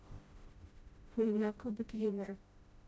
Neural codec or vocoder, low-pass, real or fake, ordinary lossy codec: codec, 16 kHz, 0.5 kbps, FreqCodec, smaller model; none; fake; none